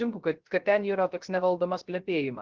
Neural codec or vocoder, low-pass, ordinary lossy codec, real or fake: codec, 16 kHz, 0.3 kbps, FocalCodec; 7.2 kHz; Opus, 16 kbps; fake